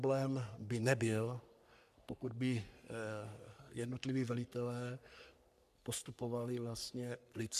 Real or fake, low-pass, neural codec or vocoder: fake; 14.4 kHz; codec, 44.1 kHz, 3.4 kbps, Pupu-Codec